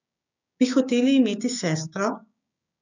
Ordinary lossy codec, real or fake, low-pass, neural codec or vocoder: none; fake; 7.2 kHz; codec, 16 kHz, 6 kbps, DAC